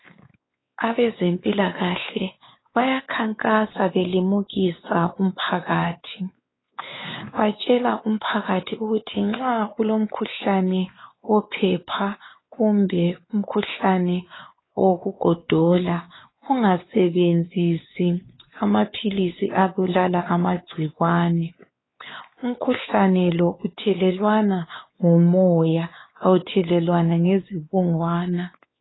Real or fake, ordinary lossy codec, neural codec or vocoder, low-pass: fake; AAC, 16 kbps; codec, 16 kHz, 4 kbps, X-Codec, WavLM features, trained on Multilingual LibriSpeech; 7.2 kHz